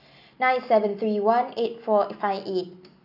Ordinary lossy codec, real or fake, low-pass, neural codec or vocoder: none; real; 5.4 kHz; none